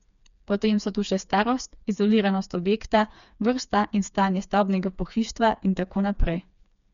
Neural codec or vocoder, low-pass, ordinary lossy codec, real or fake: codec, 16 kHz, 4 kbps, FreqCodec, smaller model; 7.2 kHz; none; fake